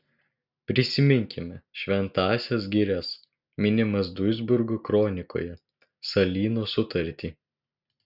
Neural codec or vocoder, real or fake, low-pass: none; real; 5.4 kHz